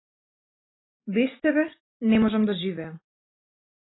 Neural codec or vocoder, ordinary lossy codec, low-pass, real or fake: none; AAC, 16 kbps; 7.2 kHz; real